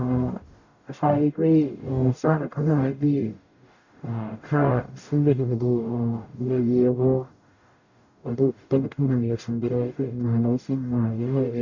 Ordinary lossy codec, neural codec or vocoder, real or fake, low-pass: none; codec, 44.1 kHz, 0.9 kbps, DAC; fake; 7.2 kHz